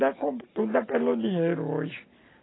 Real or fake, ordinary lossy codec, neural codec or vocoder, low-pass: real; AAC, 16 kbps; none; 7.2 kHz